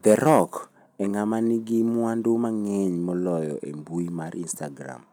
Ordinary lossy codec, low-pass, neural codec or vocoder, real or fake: none; none; none; real